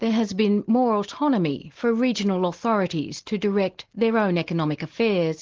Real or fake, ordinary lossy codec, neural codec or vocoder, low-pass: real; Opus, 16 kbps; none; 7.2 kHz